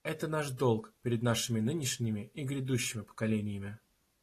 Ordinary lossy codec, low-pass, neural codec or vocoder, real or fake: AAC, 48 kbps; 14.4 kHz; none; real